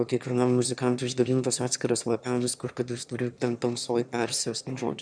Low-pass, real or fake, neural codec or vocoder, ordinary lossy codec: 9.9 kHz; fake; autoencoder, 22.05 kHz, a latent of 192 numbers a frame, VITS, trained on one speaker; MP3, 96 kbps